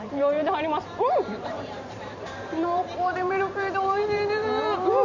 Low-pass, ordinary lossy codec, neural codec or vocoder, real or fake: 7.2 kHz; none; vocoder, 44.1 kHz, 128 mel bands every 512 samples, BigVGAN v2; fake